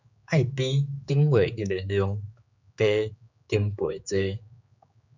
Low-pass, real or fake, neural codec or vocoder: 7.2 kHz; fake; codec, 16 kHz, 4 kbps, X-Codec, HuBERT features, trained on general audio